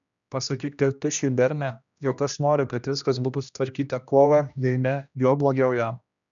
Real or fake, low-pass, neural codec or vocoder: fake; 7.2 kHz; codec, 16 kHz, 1 kbps, X-Codec, HuBERT features, trained on general audio